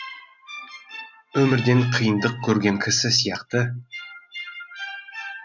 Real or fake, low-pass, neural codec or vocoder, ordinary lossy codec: real; 7.2 kHz; none; none